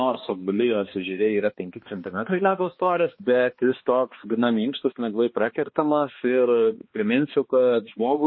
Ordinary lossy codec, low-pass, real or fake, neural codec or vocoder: MP3, 24 kbps; 7.2 kHz; fake; codec, 16 kHz, 2 kbps, X-Codec, HuBERT features, trained on balanced general audio